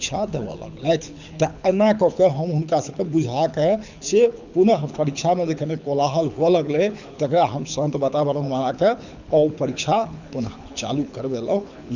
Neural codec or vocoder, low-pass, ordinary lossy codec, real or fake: codec, 24 kHz, 6 kbps, HILCodec; 7.2 kHz; none; fake